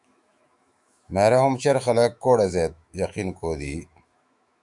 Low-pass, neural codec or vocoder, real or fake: 10.8 kHz; autoencoder, 48 kHz, 128 numbers a frame, DAC-VAE, trained on Japanese speech; fake